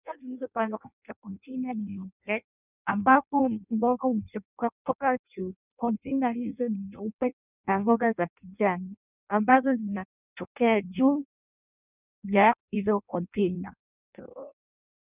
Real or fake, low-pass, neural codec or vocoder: fake; 3.6 kHz; codec, 16 kHz in and 24 kHz out, 0.6 kbps, FireRedTTS-2 codec